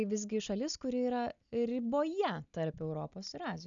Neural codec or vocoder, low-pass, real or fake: none; 7.2 kHz; real